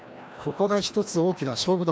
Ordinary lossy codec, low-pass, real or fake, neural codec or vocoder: none; none; fake; codec, 16 kHz, 1 kbps, FreqCodec, larger model